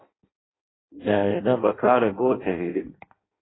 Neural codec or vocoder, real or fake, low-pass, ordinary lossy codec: codec, 16 kHz in and 24 kHz out, 0.6 kbps, FireRedTTS-2 codec; fake; 7.2 kHz; AAC, 16 kbps